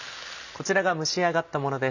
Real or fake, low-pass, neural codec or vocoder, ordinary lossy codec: real; 7.2 kHz; none; none